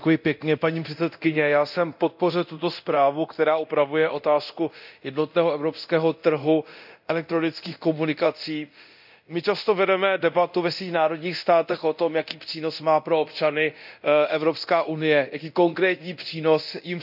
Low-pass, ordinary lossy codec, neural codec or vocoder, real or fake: 5.4 kHz; none; codec, 24 kHz, 0.9 kbps, DualCodec; fake